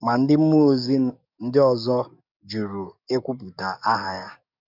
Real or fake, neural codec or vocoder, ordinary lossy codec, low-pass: fake; codec, 44.1 kHz, 7.8 kbps, DAC; none; 5.4 kHz